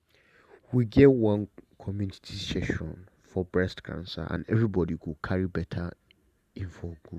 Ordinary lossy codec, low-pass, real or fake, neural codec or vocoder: Opus, 64 kbps; 14.4 kHz; fake; vocoder, 44.1 kHz, 128 mel bands every 512 samples, BigVGAN v2